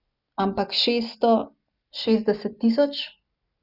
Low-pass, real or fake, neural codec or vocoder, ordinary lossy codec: 5.4 kHz; real; none; none